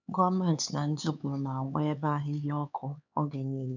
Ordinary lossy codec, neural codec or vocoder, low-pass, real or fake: none; codec, 16 kHz, 4 kbps, X-Codec, HuBERT features, trained on LibriSpeech; 7.2 kHz; fake